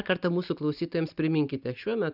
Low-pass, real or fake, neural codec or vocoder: 5.4 kHz; real; none